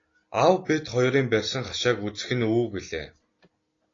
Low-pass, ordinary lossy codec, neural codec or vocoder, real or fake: 7.2 kHz; AAC, 32 kbps; none; real